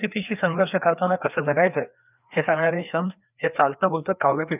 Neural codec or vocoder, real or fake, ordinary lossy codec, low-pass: codec, 16 kHz, 2 kbps, FreqCodec, larger model; fake; none; 3.6 kHz